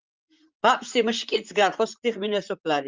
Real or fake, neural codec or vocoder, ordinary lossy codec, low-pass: fake; vocoder, 44.1 kHz, 128 mel bands, Pupu-Vocoder; Opus, 24 kbps; 7.2 kHz